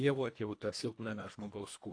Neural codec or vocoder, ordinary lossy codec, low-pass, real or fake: codec, 24 kHz, 1.5 kbps, HILCodec; AAC, 48 kbps; 9.9 kHz; fake